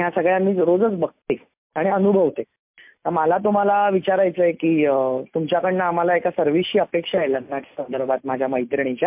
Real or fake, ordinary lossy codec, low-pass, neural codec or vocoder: real; MP3, 32 kbps; 3.6 kHz; none